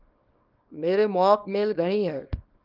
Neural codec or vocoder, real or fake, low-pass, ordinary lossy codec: codec, 24 kHz, 0.9 kbps, WavTokenizer, small release; fake; 5.4 kHz; Opus, 32 kbps